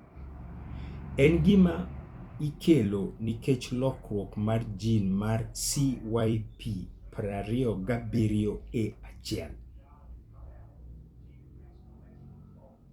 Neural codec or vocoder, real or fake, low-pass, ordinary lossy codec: vocoder, 44.1 kHz, 128 mel bands every 256 samples, BigVGAN v2; fake; 19.8 kHz; Opus, 64 kbps